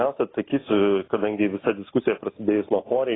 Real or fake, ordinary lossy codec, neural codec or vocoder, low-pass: real; AAC, 16 kbps; none; 7.2 kHz